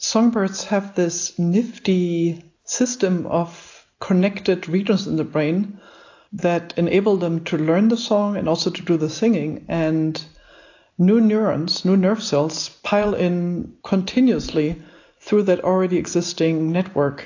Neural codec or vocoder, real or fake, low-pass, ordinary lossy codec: none; real; 7.2 kHz; AAC, 48 kbps